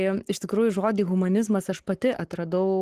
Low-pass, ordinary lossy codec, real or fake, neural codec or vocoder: 14.4 kHz; Opus, 16 kbps; fake; codec, 44.1 kHz, 7.8 kbps, Pupu-Codec